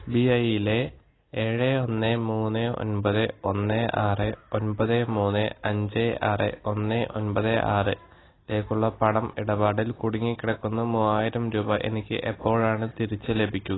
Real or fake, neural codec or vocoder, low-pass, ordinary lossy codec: real; none; 7.2 kHz; AAC, 16 kbps